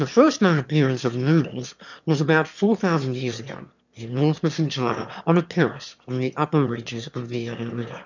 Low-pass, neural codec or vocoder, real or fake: 7.2 kHz; autoencoder, 22.05 kHz, a latent of 192 numbers a frame, VITS, trained on one speaker; fake